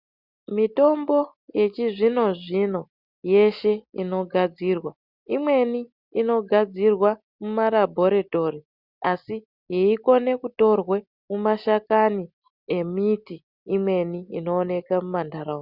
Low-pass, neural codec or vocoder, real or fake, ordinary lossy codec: 5.4 kHz; none; real; Opus, 64 kbps